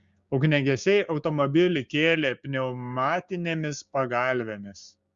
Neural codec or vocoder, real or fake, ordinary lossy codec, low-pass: codec, 16 kHz, 6 kbps, DAC; fake; Opus, 64 kbps; 7.2 kHz